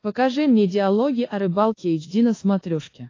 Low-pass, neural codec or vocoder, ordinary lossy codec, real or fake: 7.2 kHz; codec, 24 kHz, 1.2 kbps, DualCodec; AAC, 32 kbps; fake